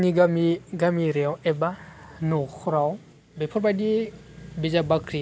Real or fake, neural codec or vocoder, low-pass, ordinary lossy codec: real; none; none; none